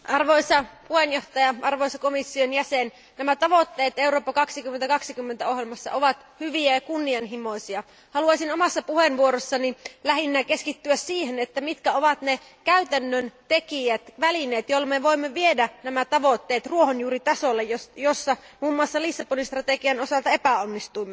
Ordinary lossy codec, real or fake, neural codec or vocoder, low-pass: none; real; none; none